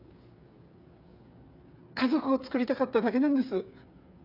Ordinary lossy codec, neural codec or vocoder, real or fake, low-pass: none; codec, 16 kHz, 8 kbps, FreqCodec, smaller model; fake; 5.4 kHz